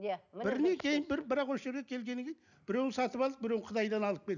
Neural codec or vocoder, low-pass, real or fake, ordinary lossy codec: none; 7.2 kHz; real; none